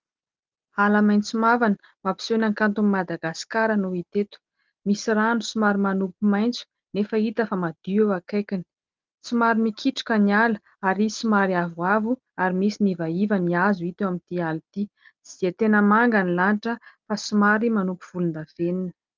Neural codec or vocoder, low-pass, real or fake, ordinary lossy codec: none; 7.2 kHz; real; Opus, 24 kbps